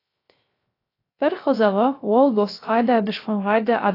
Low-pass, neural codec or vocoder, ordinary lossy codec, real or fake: 5.4 kHz; codec, 16 kHz, 0.3 kbps, FocalCodec; AAC, 24 kbps; fake